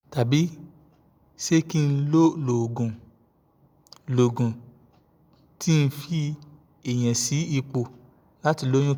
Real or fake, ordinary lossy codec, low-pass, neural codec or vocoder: real; none; none; none